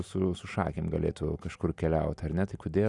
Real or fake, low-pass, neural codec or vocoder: real; 10.8 kHz; none